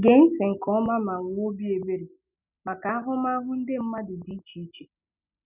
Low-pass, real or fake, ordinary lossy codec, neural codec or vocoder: 3.6 kHz; real; none; none